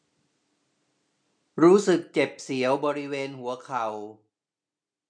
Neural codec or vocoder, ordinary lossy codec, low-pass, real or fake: none; none; 9.9 kHz; real